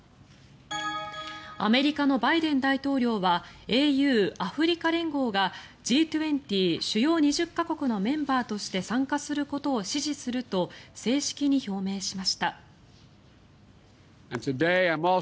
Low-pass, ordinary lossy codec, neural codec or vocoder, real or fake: none; none; none; real